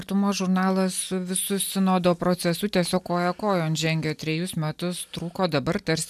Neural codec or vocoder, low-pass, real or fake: none; 14.4 kHz; real